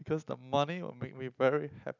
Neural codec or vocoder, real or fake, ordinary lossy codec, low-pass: none; real; none; 7.2 kHz